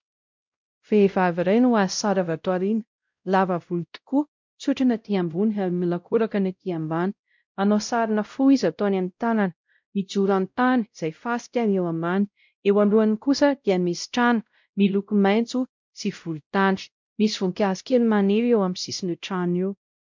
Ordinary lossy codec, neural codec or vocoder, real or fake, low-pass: MP3, 64 kbps; codec, 16 kHz, 0.5 kbps, X-Codec, WavLM features, trained on Multilingual LibriSpeech; fake; 7.2 kHz